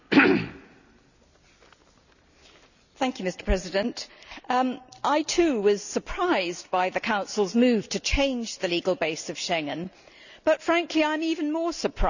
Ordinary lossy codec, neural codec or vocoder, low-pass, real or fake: none; none; 7.2 kHz; real